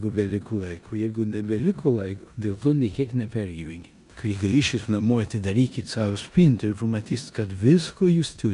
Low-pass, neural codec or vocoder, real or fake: 10.8 kHz; codec, 16 kHz in and 24 kHz out, 0.9 kbps, LongCat-Audio-Codec, four codebook decoder; fake